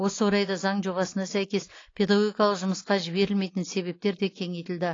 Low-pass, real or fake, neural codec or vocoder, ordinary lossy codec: 7.2 kHz; real; none; AAC, 32 kbps